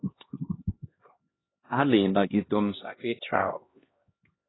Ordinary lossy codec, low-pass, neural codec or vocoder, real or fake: AAC, 16 kbps; 7.2 kHz; codec, 16 kHz, 1 kbps, X-Codec, HuBERT features, trained on LibriSpeech; fake